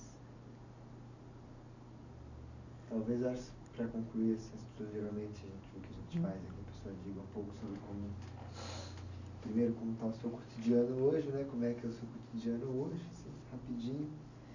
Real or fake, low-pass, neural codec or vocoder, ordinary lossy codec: real; 7.2 kHz; none; none